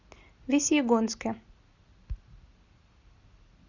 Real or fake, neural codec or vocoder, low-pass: real; none; 7.2 kHz